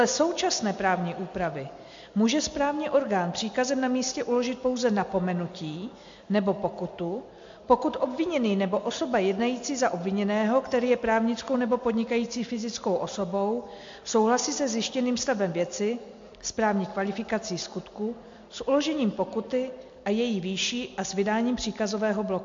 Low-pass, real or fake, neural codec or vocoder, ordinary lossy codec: 7.2 kHz; real; none; MP3, 48 kbps